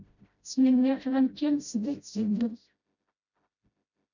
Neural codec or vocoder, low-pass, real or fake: codec, 16 kHz, 0.5 kbps, FreqCodec, smaller model; 7.2 kHz; fake